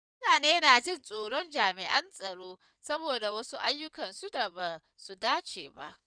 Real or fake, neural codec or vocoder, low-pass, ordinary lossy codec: fake; codec, 16 kHz in and 24 kHz out, 2.2 kbps, FireRedTTS-2 codec; 9.9 kHz; none